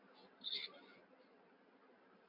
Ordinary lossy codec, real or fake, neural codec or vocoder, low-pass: MP3, 32 kbps; real; none; 5.4 kHz